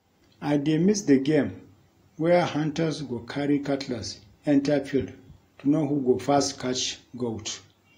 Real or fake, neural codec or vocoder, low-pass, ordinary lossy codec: real; none; 19.8 kHz; AAC, 48 kbps